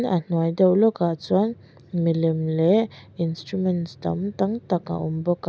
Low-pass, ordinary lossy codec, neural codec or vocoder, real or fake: none; none; none; real